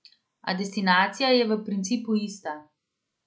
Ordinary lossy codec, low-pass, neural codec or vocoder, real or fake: none; none; none; real